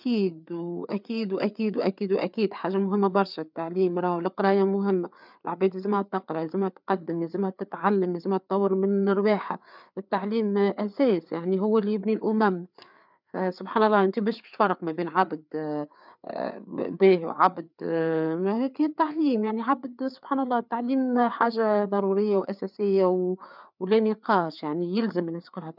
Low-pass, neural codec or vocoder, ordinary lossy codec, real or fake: 5.4 kHz; codec, 16 kHz, 4 kbps, FreqCodec, larger model; none; fake